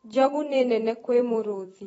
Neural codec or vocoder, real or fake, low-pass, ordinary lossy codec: vocoder, 44.1 kHz, 128 mel bands every 256 samples, BigVGAN v2; fake; 19.8 kHz; AAC, 24 kbps